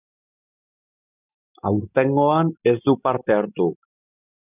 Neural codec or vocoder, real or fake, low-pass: none; real; 3.6 kHz